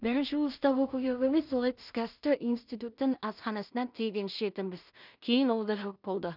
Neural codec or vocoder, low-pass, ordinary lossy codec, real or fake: codec, 16 kHz in and 24 kHz out, 0.4 kbps, LongCat-Audio-Codec, two codebook decoder; 5.4 kHz; none; fake